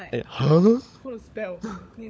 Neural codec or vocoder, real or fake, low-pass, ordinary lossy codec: codec, 16 kHz, 16 kbps, FunCodec, trained on LibriTTS, 50 frames a second; fake; none; none